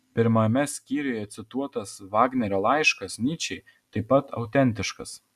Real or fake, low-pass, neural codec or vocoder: real; 14.4 kHz; none